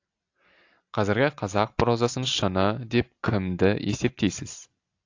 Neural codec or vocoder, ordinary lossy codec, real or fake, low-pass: none; AAC, 48 kbps; real; 7.2 kHz